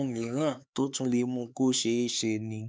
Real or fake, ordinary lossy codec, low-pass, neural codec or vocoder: fake; none; none; codec, 16 kHz, 4 kbps, X-Codec, HuBERT features, trained on LibriSpeech